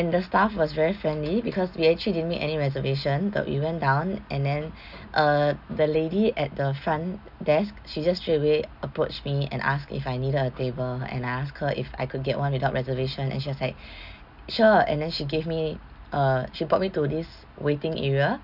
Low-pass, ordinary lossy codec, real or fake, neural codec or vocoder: 5.4 kHz; none; real; none